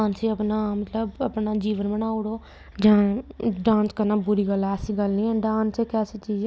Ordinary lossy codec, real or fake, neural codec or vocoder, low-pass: none; real; none; none